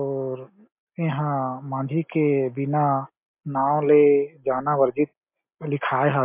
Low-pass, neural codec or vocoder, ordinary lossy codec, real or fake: 3.6 kHz; none; MP3, 32 kbps; real